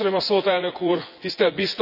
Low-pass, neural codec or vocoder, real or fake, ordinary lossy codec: 5.4 kHz; vocoder, 24 kHz, 100 mel bands, Vocos; fake; none